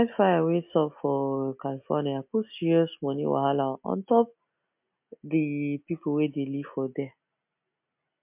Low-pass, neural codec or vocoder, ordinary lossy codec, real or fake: 3.6 kHz; none; MP3, 32 kbps; real